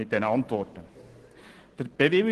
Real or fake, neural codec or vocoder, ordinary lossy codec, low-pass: real; none; Opus, 16 kbps; 10.8 kHz